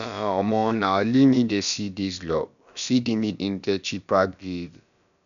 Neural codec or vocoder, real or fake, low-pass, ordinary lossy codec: codec, 16 kHz, about 1 kbps, DyCAST, with the encoder's durations; fake; 7.2 kHz; none